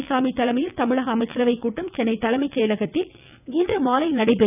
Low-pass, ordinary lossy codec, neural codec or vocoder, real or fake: 3.6 kHz; none; vocoder, 22.05 kHz, 80 mel bands, WaveNeXt; fake